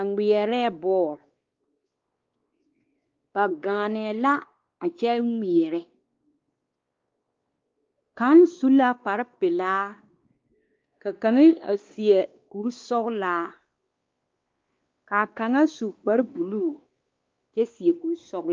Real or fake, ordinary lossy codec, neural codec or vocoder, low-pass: fake; Opus, 32 kbps; codec, 16 kHz, 2 kbps, X-Codec, WavLM features, trained on Multilingual LibriSpeech; 7.2 kHz